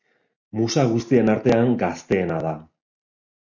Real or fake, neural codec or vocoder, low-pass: real; none; 7.2 kHz